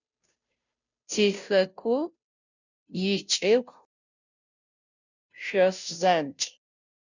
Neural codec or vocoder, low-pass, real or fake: codec, 16 kHz, 0.5 kbps, FunCodec, trained on Chinese and English, 25 frames a second; 7.2 kHz; fake